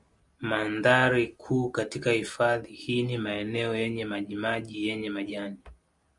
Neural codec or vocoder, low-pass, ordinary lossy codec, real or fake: none; 10.8 kHz; MP3, 96 kbps; real